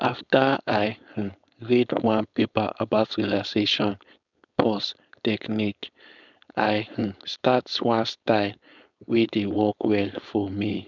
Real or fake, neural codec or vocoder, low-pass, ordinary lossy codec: fake; codec, 16 kHz, 4.8 kbps, FACodec; 7.2 kHz; none